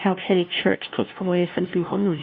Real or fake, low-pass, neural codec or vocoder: fake; 7.2 kHz; codec, 16 kHz, 0.5 kbps, FunCodec, trained on LibriTTS, 25 frames a second